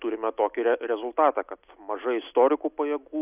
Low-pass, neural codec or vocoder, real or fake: 3.6 kHz; none; real